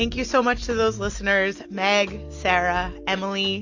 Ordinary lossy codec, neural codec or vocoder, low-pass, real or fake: AAC, 48 kbps; none; 7.2 kHz; real